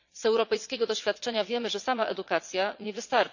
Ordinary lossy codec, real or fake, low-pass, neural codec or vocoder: none; fake; 7.2 kHz; vocoder, 22.05 kHz, 80 mel bands, WaveNeXt